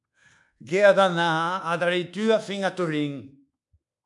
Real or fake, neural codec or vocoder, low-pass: fake; codec, 24 kHz, 1.2 kbps, DualCodec; 10.8 kHz